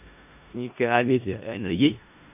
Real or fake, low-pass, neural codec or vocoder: fake; 3.6 kHz; codec, 16 kHz in and 24 kHz out, 0.4 kbps, LongCat-Audio-Codec, four codebook decoder